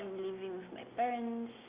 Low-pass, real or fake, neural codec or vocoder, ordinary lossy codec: 3.6 kHz; real; none; Opus, 64 kbps